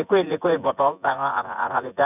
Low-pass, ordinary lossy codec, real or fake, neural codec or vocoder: 3.6 kHz; none; fake; vocoder, 24 kHz, 100 mel bands, Vocos